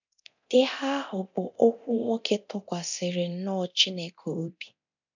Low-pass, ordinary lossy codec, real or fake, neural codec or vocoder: 7.2 kHz; none; fake; codec, 24 kHz, 0.9 kbps, DualCodec